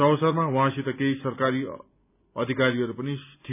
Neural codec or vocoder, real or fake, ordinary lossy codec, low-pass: none; real; none; 3.6 kHz